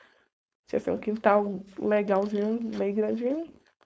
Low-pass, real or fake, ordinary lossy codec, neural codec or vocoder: none; fake; none; codec, 16 kHz, 4.8 kbps, FACodec